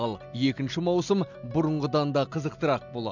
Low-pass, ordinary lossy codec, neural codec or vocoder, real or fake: 7.2 kHz; none; none; real